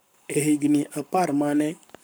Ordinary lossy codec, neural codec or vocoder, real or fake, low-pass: none; codec, 44.1 kHz, 7.8 kbps, Pupu-Codec; fake; none